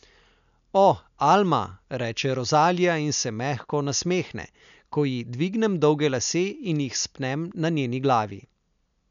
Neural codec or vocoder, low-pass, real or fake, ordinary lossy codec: none; 7.2 kHz; real; none